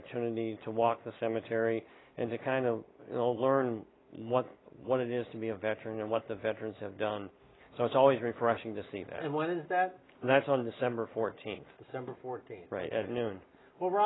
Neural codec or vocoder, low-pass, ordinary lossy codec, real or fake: none; 7.2 kHz; AAC, 16 kbps; real